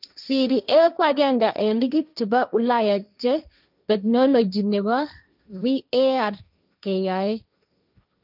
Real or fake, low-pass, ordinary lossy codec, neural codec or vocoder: fake; 5.4 kHz; none; codec, 16 kHz, 1.1 kbps, Voila-Tokenizer